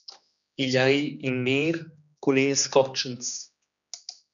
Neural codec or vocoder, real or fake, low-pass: codec, 16 kHz, 2 kbps, X-Codec, HuBERT features, trained on general audio; fake; 7.2 kHz